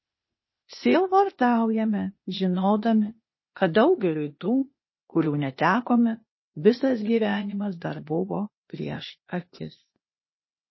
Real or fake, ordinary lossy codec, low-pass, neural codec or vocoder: fake; MP3, 24 kbps; 7.2 kHz; codec, 16 kHz, 0.8 kbps, ZipCodec